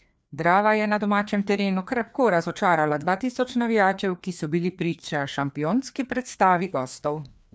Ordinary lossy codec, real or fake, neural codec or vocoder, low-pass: none; fake; codec, 16 kHz, 2 kbps, FreqCodec, larger model; none